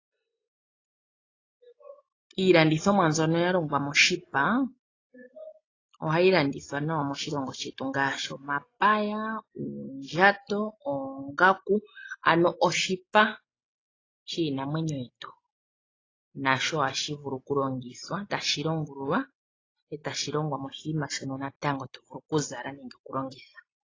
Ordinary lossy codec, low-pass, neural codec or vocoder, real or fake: AAC, 32 kbps; 7.2 kHz; none; real